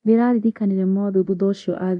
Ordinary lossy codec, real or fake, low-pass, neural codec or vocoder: none; fake; 10.8 kHz; codec, 24 kHz, 0.9 kbps, DualCodec